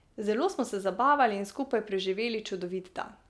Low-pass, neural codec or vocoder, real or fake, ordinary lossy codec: none; none; real; none